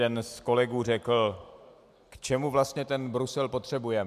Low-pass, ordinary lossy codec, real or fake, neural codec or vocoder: 14.4 kHz; MP3, 96 kbps; real; none